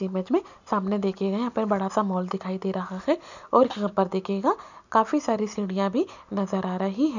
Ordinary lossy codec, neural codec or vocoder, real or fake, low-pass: none; none; real; 7.2 kHz